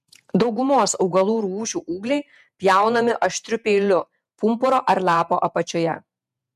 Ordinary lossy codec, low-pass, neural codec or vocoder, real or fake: MP3, 96 kbps; 14.4 kHz; vocoder, 48 kHz, 128 mel bands, Vocos; fake